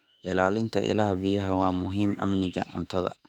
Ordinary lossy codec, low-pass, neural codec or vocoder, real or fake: none; 19.8 kHz; autoencoder, 48 kHz, 32 numbers a frame, DAC-VAE, trained on Japanese speech; fake